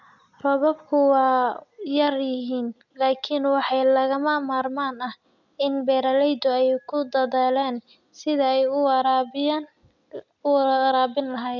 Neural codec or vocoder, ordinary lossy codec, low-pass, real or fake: none; none; 7.2 kHz; real